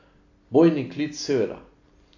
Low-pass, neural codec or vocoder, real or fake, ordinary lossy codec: 7.2 kHz; none; real; AAC, 48 kbps